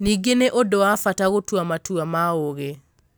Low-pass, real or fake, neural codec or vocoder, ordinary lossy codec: none; real; none; none